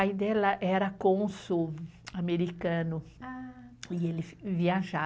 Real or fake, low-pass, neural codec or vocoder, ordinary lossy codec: real; none; none; none